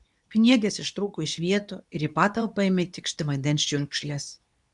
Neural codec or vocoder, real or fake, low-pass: codec, 24 kHz, 0.9 kbps, WavTokenizer, medium speech release version 2; fake; 10.8 kHz